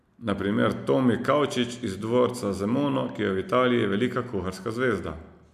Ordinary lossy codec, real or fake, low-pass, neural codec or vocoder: MP3, 96 kbps; real; 14.4 kHz; none